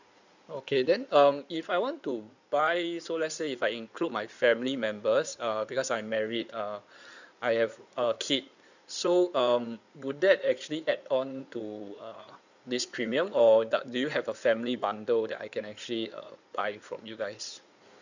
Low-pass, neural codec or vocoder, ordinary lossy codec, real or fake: 7.2 kHz; codec, 16 kHz in and 24 kHz out, 2.2 kbps, FireRedTTS-2 codec; none; fake